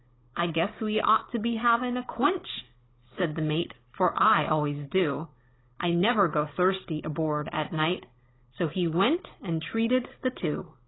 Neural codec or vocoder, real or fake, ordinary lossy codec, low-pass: codec, 16 kHz, 16 kbps, FunCodec, trained on Chinese and English, 50 frames a second; fake; AAC, 16 kbps; 7.2 kHz